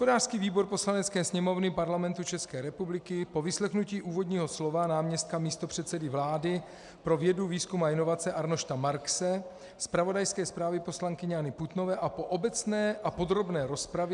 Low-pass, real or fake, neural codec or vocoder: 10.8 kHz; real; none